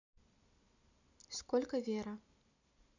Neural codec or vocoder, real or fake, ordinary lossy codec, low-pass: none; real; none; 7.2 kHz